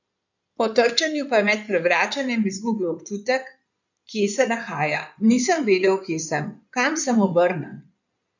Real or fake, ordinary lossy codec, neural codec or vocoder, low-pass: fake; none; codec, 16 kHz in and 24 kHz out, 2.2 kbps, FireRedTTS-2 codec; 7.2 kHz